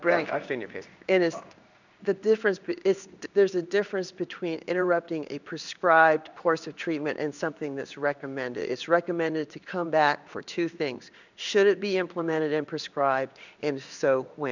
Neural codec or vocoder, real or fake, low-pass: codec, 16 kHz in and 24 kHz out, 1 kbps, XY-Tokenizer; fake; 7.2 kHz